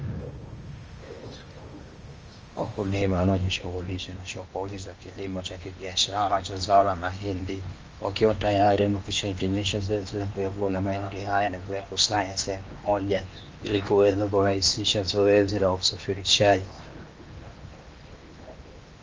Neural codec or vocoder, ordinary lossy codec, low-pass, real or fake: codec, 16 kHz in and 24 kHz out, 0.8 kbps, FocalCodec, streaming, 65536 codes; Opus, 24 kbps; 7.2 kHz; fake